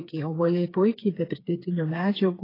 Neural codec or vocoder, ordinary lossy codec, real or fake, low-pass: codec, 16 kHz, 4 kbps, FreqCodec, smaller model; AAC, 24 kbps; fake; 5.4 kHz